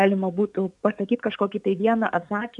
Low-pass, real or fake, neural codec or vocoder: 10.8 kHz; fake; codec, 44.1 kHz, 7.8 kbps, DAC